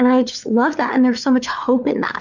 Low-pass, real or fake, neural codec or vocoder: 7.2 kHz; fake; codec, 16 kHz, 4 kbps, FunCodec, trained on LibriTTS, 50 frames a second